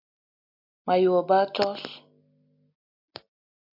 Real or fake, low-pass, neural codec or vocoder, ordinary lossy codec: real; 5.4 kHz; none; MP3, 48 kbps